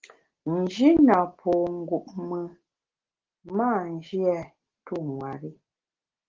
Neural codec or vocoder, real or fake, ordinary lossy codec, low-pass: none; real; Opus, 16 kbps; 7.2 kHz